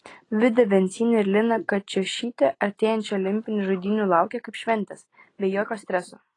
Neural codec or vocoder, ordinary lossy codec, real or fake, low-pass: none; AAC, 32 kbps; real; 10.8 kHz